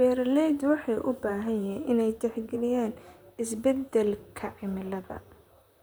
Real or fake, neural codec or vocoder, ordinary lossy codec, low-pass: fake; vocoder, 44.1 kHz, 128 mel bands, Pupu-Vocoder; none; none